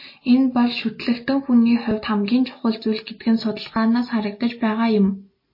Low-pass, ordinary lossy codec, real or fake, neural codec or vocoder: 5.4 kHz; MP3, 24 kbps; real; none